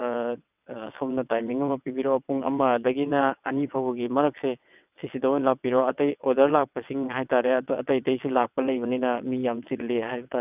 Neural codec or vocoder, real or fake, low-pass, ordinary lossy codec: vocoder, 22.05 kHz, 80 mel bands, WaveNeXt; fake; 3.6 kHz; none